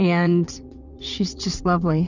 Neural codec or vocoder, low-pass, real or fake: vocoder, 22.05 kHz, 80 mel bands, WaveNeXt; 7.2 kHz; fake